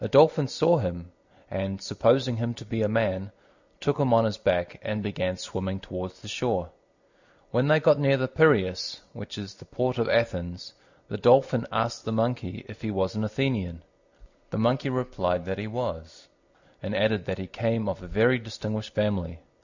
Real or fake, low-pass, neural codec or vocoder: real; 7.2 kHz; none